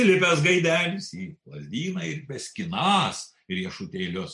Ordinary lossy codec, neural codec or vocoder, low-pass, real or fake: MP3, 64 kbps; none; 10.8 kHz; real